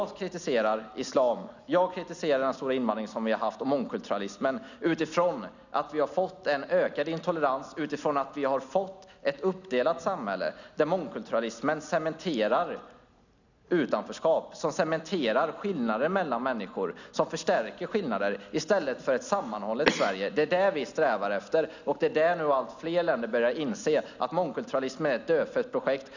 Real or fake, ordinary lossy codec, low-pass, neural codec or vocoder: real; none; 7.2 kHz; none